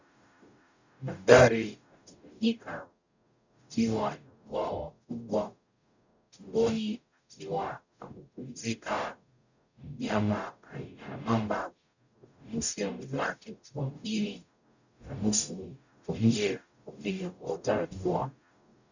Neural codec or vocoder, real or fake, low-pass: codec, 44.1 kHz, 0.9 kbps, DAC; fake; 7.2 kHz